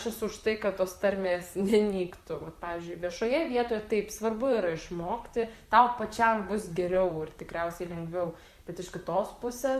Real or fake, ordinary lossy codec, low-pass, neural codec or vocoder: fake; Opus, 64 kbps; 14.4 kHz; vocoder, 44.1 kHz, 128 mel bands, Pupu-Vocoder